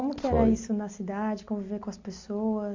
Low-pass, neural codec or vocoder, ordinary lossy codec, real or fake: 7.2 kHz; none; AAC, 48 kbps; real